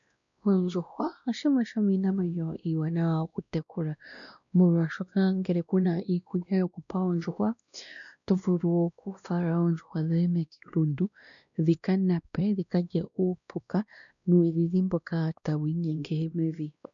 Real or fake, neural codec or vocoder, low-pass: fake; codec, 16 kHz, 1 kbps, X-Codec, WavLM features, trained on Multilingual LibriSpeech; 7.2 kHz